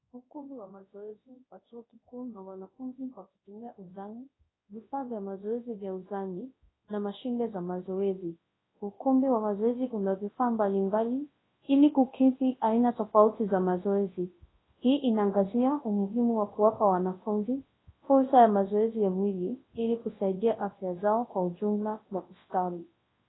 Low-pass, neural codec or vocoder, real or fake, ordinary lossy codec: 7.2 kHz; codec, 24 kHz, 0.9 kbps, WavTokenizer, large speech release; fake; AAC, 16 kbps